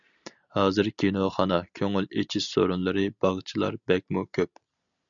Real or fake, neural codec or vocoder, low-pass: real; none; 7.2 kHz